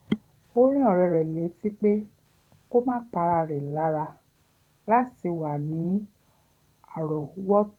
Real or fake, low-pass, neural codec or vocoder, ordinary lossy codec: fake; 19.8 kHz; vocoder, 44.1 kHz, 128 mel bands every 512 samples, BigVGAN v2; none